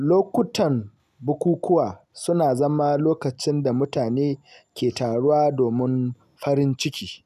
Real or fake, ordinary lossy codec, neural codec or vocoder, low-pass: real; none; none; 14.4 kHz